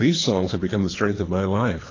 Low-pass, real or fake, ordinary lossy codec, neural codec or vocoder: 7.2 kHz; fake; AAC, 32 kbps; codec, 24 kHz, 3 kbps, HILCodec